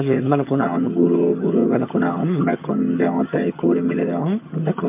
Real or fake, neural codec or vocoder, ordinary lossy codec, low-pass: fake; vocoder, 22.05 kHz, 80 mel bands, HiFi-GAN; MP3, 24 kbps; 3.6 kHz